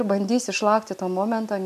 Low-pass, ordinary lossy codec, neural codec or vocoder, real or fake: 14.4 kHz; MP3, 96 kbps; none; real